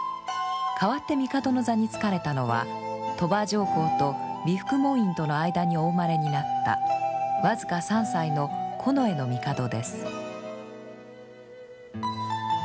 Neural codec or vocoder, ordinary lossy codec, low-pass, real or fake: none; none; none; real